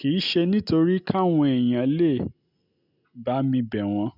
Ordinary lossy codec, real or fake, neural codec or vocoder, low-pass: none; real; none; 5.4 kHz